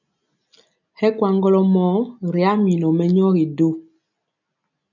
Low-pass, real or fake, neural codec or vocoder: 7.2 kHz; real; none